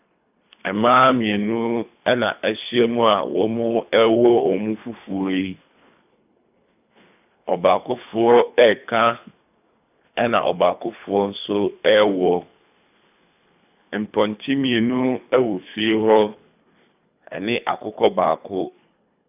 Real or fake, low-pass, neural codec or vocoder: fake; 3.6 kHz; codec, 24 kHz, 3 kbps, HILCodec